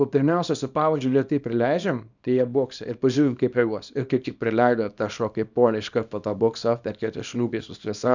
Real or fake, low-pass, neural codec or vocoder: fake; 7.2 kHz; codec, 24 kHz, 0.9 kbps, WavTokenizer, small release